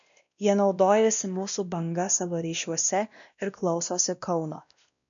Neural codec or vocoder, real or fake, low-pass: codec, 16 kHz, 1 kbps, X-Codec, WavLM features, trained on Multilingual LibriSpeech; fake; 7.2 kHz